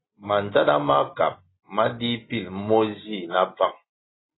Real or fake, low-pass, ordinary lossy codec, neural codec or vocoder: real; 7.2 kHz; AAC, 16 kbps; none